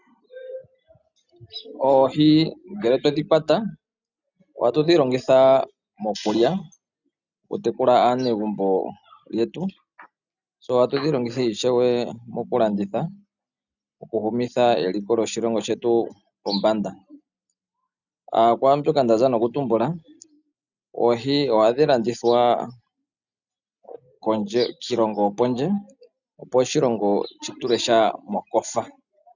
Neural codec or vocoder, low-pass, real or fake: none; 7.2 kHz; real